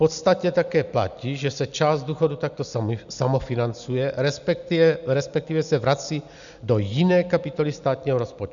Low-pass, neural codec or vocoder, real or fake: 7.2 kHz; none; real